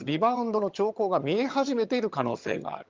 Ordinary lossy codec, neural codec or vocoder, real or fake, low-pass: Opus, 24 kbps; vocoder, 22.05 kHz, 80 mel bands, HiFi-GAN; fake; 7.2 kHz